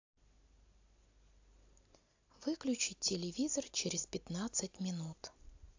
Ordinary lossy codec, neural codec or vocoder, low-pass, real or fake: AAC, 48 kbps; none; 7.2 kHz; real